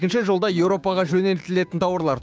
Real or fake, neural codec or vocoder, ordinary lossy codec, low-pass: fake; codec, 16 kHz, 6 kbps, DAC; none; none